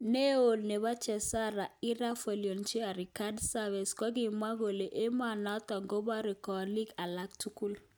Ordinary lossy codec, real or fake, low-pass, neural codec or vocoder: none; real; none; none